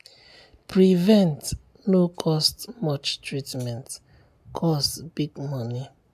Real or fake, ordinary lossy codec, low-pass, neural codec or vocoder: real; AAC, 96 kbps; 14.4 kHz; none